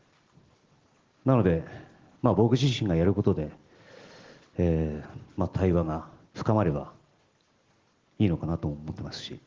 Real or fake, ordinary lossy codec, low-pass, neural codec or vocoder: real; Opus, 16 kbps; 7.2 kHz; none